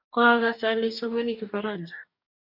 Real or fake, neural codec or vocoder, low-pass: fake; codec, 44.1 kHz, 2.6 kbps, DAC; 5.4 kHz